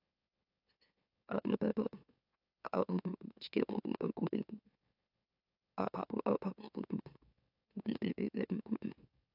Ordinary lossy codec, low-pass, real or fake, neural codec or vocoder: AAC, 48 kbps; 5.4 kHz; fake; autoencoder, 44.1 kHz, a latent of 192 numbers a frame, MeloTTS